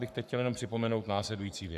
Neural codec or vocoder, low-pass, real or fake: codec, 44.1 kHz, 7.8 kbps, Pupu-Codec; 14.4 kHz; fake